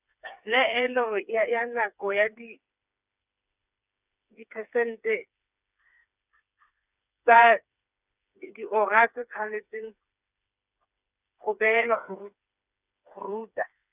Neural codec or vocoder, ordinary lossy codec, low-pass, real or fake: codec, 16 kHz, 4 kbps, FreqCodec, smaller model; none; 3.6 kHz; fake